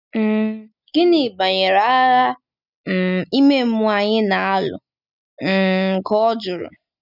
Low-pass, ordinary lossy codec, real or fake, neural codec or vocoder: 5.4 kHz; none; real; none